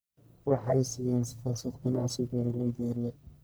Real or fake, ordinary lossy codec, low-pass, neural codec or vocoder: fake; none; none; codec, 44.1 kHz, 1.7 kbps, Pupu-Codec